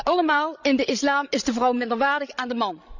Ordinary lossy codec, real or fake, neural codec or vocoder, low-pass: none; fake; codec, 16 kHz, 16 kbps, FreqCodec, larger model; 7.2 kHz